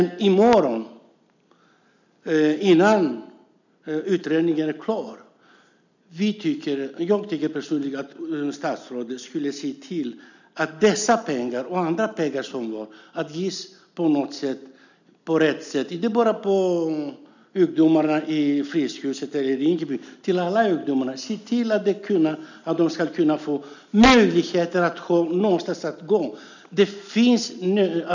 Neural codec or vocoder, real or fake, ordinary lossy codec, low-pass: none; real; none; 7.2 kHz